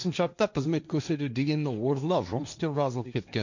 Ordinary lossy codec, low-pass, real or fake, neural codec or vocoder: none; 7.2 kHz; fake; codec, 16 kHz, 1.1 kbps, Voila-Tokenizer